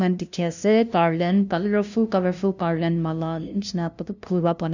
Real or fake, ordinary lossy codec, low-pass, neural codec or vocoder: fake; none; 7.2 kHz; codec, 16 kHz, 0.5 kbps, FunCodec, trained on LibriTTS, 25 frames a second